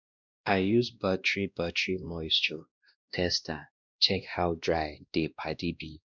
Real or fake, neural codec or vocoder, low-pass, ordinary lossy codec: fake; codec, 16 kHz, 1 kbps, X-Codec, WavLM features, trained on Multilingual LibriSpeech; 7.2 kHz; none